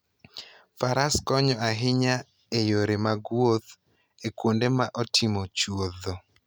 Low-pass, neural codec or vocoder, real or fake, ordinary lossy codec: none; none; real; none